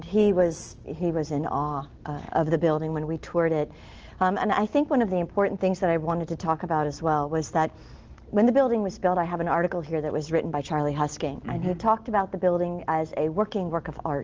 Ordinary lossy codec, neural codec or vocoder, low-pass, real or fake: Opus, 16 kbps; none; 7.2 kHz; real